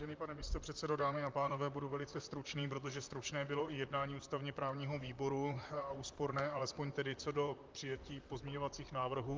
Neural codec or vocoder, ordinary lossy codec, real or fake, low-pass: vocoder, 44.1 kHz, 128 mel bands, Pupu-Vocoder; Opus, 32 kbps; fake; 7.2 kHz